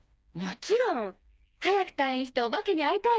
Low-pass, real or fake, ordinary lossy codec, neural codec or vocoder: none; fake; none; codec, 16 kHz, 2 kbps, FreqCodec, smaller model